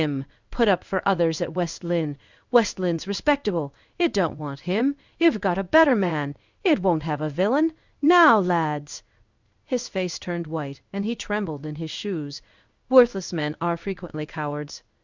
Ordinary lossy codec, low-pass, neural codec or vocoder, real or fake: Opus, 64 kbps; 7.2 kHz; codec, 16 kHz in and 24 kHz out, 1 kbps, XY-Tokenizer; fake